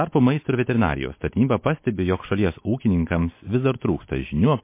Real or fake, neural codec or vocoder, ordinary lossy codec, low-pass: real; none; MP3, 24 kbps; 3.6 kHz